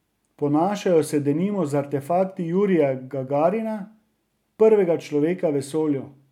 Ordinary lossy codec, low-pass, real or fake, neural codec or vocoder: MP3, 96 kbps; 19.8 kHz; real; none